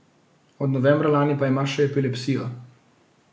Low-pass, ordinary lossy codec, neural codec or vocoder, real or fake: none; none; none; real